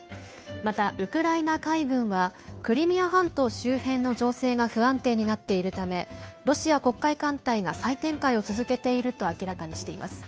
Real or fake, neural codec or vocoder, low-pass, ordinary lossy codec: fake; codec, 16 kHz, 2 kbps, FunCodec, trained on Chinese and English, 25 frames a second; none; none